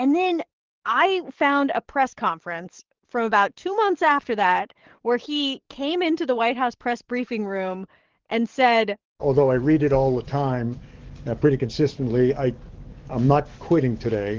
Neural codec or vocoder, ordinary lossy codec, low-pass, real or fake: codec, 44.1 kHz, 7.8 kbps, DAC; Opus, 16 kbps; 7.2 kHz; fake